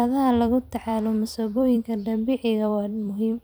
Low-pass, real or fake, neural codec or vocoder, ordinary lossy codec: none; fake; vocoder, 44.1 kHz, 128 mel bands every 256 samples, BigVGAN v2; none